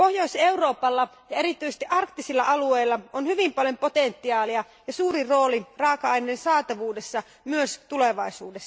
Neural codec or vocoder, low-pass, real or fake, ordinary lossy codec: none; none; real; none